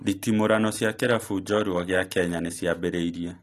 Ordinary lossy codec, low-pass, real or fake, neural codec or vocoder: AAC, 48 kbps; 14.4 kHz; real; none